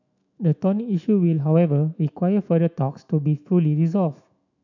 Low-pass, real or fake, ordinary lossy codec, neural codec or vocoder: 7.2 kHz; fake; none; autoencoder, 48 kHz, 128 numbers a frame, DAC-VAE, trained on Japanese speech